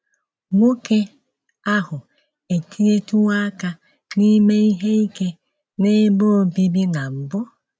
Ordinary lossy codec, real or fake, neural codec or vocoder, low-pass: none; real; none; none